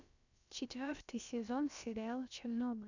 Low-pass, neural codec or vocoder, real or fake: 7.2 kHz; codec, 16 kHz, about 1 kbps, DyCAST, with the encoder's durations; fake